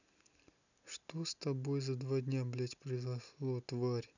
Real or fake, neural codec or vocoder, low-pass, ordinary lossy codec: real; none; 7.2 kHz; none